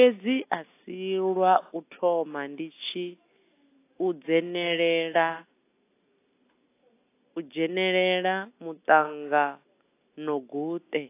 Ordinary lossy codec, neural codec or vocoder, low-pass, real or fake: AAC, 24 kbps; none; 3.6 kHz; real